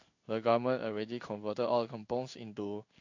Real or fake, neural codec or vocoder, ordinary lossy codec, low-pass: fake; codec, 16 kHz in and 24 kHz out, 1 kbps, XY-Tokenizer; AAC, 48 kbps; 7.2 kHz